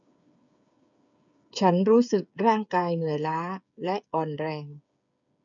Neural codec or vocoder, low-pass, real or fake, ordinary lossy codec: codec, 16 kHz, 8 kbps, FreqCodec, smaller model; 7.2 kHz; fake; none